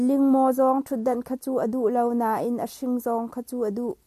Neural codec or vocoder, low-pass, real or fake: none; 14.4 kHz; real